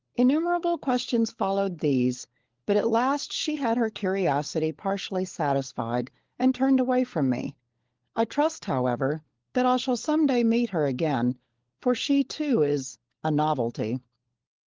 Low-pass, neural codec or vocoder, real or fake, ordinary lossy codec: 7.2 kHz; codec, 16 kHz, 16 kbps, FunCodec, trained on LibriTTS, 50 frames a second; fake; Opus, 16 kbps